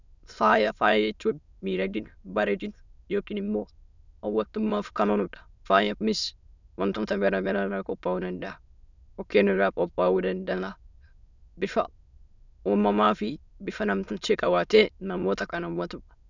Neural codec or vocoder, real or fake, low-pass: autoencoder, 22.05 kHz, a latent of 192 numbers a frame, VITS, trained on many speakers; fake; 7.2 kHz